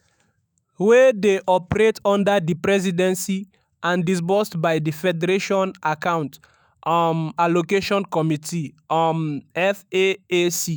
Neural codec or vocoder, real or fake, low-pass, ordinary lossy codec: autoencoder, 48 kHz, 128 numbers a frame, DAC-VAE, trained on Japanese speech; fake; none; none